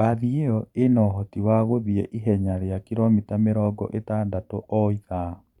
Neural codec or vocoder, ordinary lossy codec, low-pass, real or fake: vocoder, 44.1 kHz, 128 mel bands every 512 samples, BigVGAN v2; none; 19.8 kHz; fake